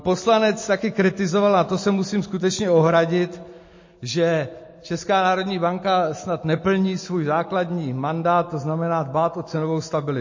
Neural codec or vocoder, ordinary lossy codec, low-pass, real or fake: none; MP3, 32 kbps; 7.2 kHz; real